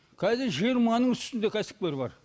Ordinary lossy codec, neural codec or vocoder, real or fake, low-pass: none; none; real; none